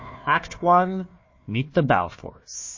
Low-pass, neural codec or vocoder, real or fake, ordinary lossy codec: 7.2 kHz; codec, 16 kHz, 1 kbps, FunCodec, trained on Chinese and English, 50 frames a second; fake; MP3, 32 kbps